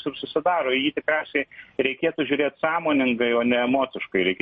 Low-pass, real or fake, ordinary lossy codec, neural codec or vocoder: 9.9 kHz; real; MP3, 32 kbps; none